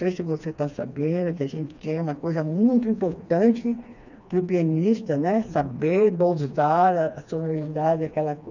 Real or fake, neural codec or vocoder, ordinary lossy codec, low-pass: fake; codec, 16 kHz, 2 kbps, FreqCodec, smaller model; none; 7.2 kHz